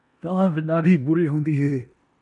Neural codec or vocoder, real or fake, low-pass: codec, 16 kHz in and 24 kHz out, 0.9 kbps, LongCat-Audio-Codec, four codebook decoder; fake; 10.8 kHz